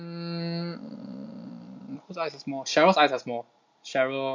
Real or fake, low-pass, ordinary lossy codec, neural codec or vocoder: real; 7.2 kHz; MP3, 48 kbps; none